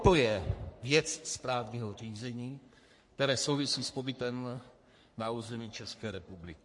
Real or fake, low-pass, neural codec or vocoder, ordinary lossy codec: fake; 10.8 kHz; codec, 44.1 kHz, 3.4 kbps, Pupu-Codec; MP3, 48 kbps